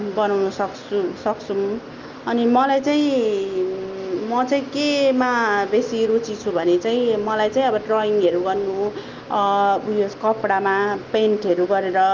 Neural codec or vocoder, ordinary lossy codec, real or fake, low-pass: none; Opus, 32 kbps; real; 7.2 kHz